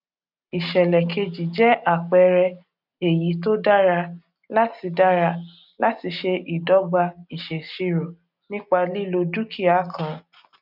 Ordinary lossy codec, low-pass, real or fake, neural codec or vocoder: none; 5.4 kHz; real; none